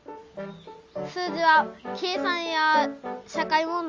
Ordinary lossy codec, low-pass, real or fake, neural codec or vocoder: Opus, 32 kbps; 7.2 kHz; real; none